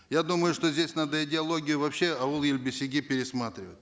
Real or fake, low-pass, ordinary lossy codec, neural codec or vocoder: real; none; none; none